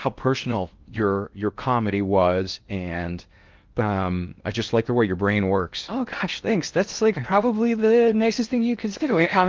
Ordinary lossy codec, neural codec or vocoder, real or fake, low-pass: Opus, 24 kbps; codec, 16 kHz in and 24 kHz out, 0.8 kbps, FocalCodec, streaming, 65536 codes; fake; 7.2 kHz